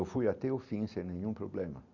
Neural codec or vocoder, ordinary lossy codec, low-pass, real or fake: none; none; 7.2 kHz; real